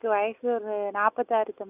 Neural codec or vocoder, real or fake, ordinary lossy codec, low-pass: none; real; none; 3.6 kHz